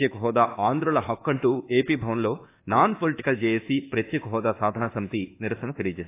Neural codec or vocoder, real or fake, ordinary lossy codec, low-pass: codec, 24 kHz, 1.2 kbps, DualCodec; fake; AAC, 24 kbps; 3.6 kHz